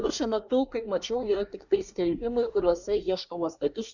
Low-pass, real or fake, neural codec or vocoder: 7.2 kHz; fake; codec, 24 kHz, 1 kbps, SNAC